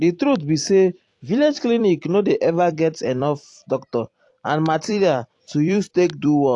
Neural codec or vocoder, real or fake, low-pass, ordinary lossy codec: vocoder, 44.1 kHz, 128 mel bands every 256 samples, BigVGAN v2; fake; 10.8 kHz; AAC, 48 kbps